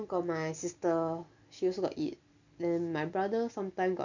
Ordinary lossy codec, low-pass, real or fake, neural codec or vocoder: none; 7.2 kHz; real; none